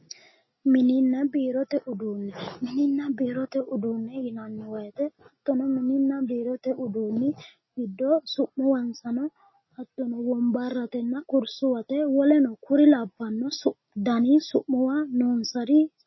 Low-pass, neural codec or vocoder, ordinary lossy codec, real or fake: 7.2 kHz; none; MP3, 24 kbps; real